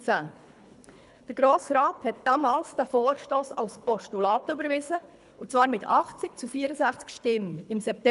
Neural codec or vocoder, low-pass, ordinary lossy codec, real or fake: codec, 24 kHz, 3 kbps, HILCodec; 10.8 kHz; none; fake